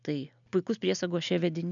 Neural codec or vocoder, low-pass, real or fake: none; 7.2 kHz; real